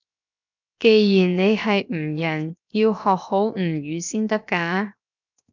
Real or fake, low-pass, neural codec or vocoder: fake; 7.2 kHz; codec, 16 kHz, 0.7 kbps, FocalCodec